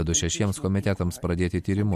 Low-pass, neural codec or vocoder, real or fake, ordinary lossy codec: 14.4 kHz; none; real; MP3, 64 kbps